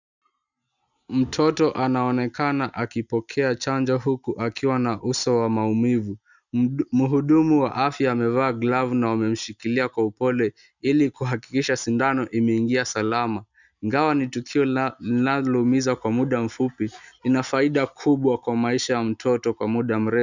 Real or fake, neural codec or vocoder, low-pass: real; none; 7.2 kHz